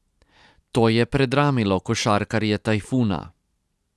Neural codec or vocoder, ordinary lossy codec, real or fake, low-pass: none; none; real; none